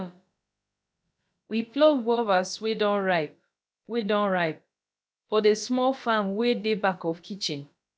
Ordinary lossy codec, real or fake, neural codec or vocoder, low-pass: none; fake; codec, 16 kHz, about 1 kbps, DyCAST, with the encoder's durations; none